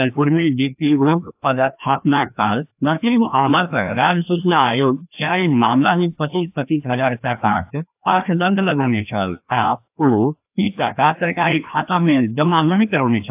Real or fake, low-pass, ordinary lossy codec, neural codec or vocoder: fake; 3.6 kHz; AAC, 32 kbps; codec, 16 kHz, 1 kbps, FreqCodec, larger model